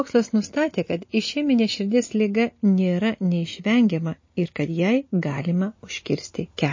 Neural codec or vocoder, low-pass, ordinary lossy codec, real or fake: none; 7.2 kHz; MP3, 32 kbps; real